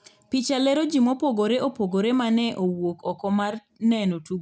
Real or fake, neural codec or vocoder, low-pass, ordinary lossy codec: real; none; none; none